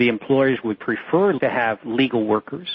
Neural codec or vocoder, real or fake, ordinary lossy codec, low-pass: none; real; MP3, 24 kbps; 7.2 kHz